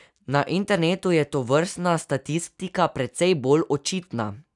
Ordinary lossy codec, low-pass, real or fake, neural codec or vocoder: none; 10.8 kHz; real; none